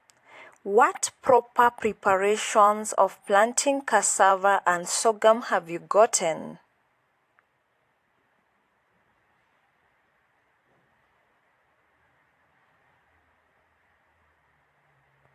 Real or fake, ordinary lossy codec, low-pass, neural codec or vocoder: fake; AAC, 64 kbps; 14.4 kHz; vocoder, 44.1 kHz, 128 mel bands every 512 samples, BigVGAN v2